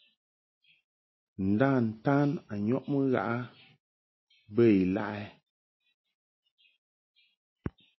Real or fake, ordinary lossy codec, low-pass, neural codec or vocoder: real; MP3, 24 kbps; 7.2 kHz; none